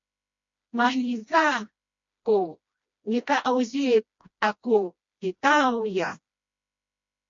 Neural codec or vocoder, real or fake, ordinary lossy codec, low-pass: codec, 16 kHz, 1 kbps, FreqCodec, smaller model; fake; MP3, 48 kbps; 7.2 kHz